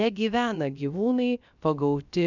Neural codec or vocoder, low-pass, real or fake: codec, 16 kHz, about 1 kbps, DyCAST, with the encoder's durations; 7.2 kHz; fake